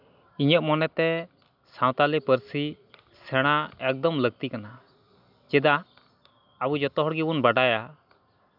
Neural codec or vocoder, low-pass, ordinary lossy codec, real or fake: none; 5.4 kHz; none; real